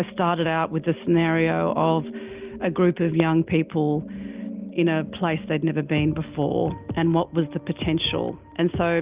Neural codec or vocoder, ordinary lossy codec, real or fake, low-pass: none; Opus, 32 kbps; real; 3.6 kHz